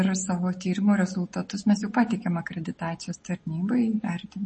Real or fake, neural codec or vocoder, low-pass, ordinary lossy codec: real; none; 10.8 kHz; MP3, 32 kbps